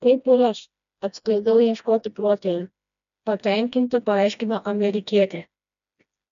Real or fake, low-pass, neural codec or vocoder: fake; 7.2 kHz; codec, 16 kHz, 1 kbps, FreqCodec, smaller model